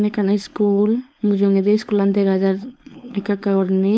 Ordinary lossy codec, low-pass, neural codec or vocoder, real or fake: none; none; codec, 16 kHz, 4.8 kbps, FACodec; fake